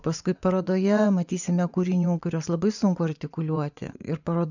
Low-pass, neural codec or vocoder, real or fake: 7.2 kHz; vocoder, 24 kHz, 100 mel bands, Vocos; fake